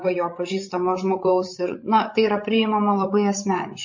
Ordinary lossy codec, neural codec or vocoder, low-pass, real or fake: MP3, 32 kbps; codec, 16 kHz, 16 kbps, FreqCodec, larger model; 7.2 kHz; fake